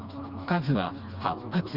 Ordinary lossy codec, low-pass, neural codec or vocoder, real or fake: Opus, 32 kbps; 5.4 kHz; codec, 16 kHz, 2 kbps, FreqCodec, smaller model; fake